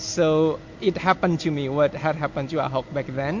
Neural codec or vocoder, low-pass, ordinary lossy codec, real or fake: none; 7.2 kHz; MP3, 48 kbps; real